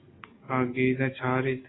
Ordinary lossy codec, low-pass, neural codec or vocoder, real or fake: AAC, 16 kbps; 7.2 kHz; none; real